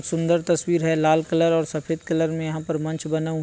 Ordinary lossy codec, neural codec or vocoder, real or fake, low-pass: none; none; real; none